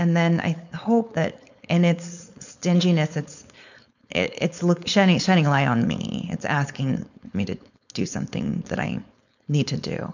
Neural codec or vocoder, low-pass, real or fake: codec, 16 kHz, 4.8 kbps, FACodec; 7.2 kHz; fake